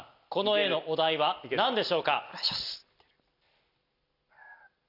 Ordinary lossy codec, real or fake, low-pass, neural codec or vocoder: none; real; 5.4 kHz; none